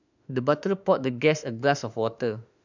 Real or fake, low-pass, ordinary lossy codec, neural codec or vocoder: fake; 7.2 kHz; none; autoencoder, 48 kHz, 32 numbers a frame, DAC-VAE, trained on Japanese speech